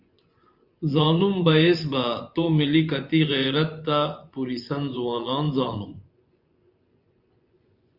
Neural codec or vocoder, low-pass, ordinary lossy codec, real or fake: vocoder, 24 kHz, 100 mel bands, Vocos; 5.4 kHz; Opus, 64 kbps; fake